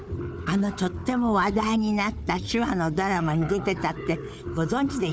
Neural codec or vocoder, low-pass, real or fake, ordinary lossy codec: codec, 16 kHz, 4 kbps, FunCodec, trained on Chinese and English, 50 frames a second; none; fake; none